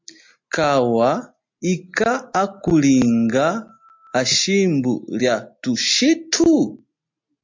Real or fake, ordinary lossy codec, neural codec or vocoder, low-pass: real; MP3, 48 kbps; none; 7.2 kHz